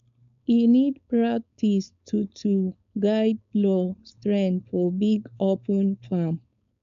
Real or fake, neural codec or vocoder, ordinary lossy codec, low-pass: fake; codec, 16 kHz, 4.8 kbps, FACodec; none; 7.2 kHz